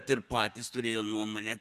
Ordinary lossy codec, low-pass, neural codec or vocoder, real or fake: Opus, 64 kbps; 14.4 kHz; codec, 32 kHz, 1.9 kbps, SNAC; fake